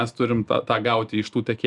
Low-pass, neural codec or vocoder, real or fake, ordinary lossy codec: 10.8 kHz; none; real; Opus, 64 kbps